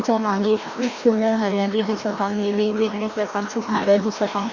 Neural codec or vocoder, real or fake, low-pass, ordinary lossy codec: codec, 16 kHz, 1 kbps, FreqCodec, larger model; fake; 7.2 kHz; Opus, 64 kbps